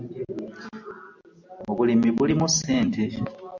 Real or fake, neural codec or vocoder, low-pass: real; none; 7.2 kHz